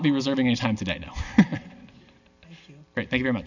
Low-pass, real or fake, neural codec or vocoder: 7.2 kHz; real; none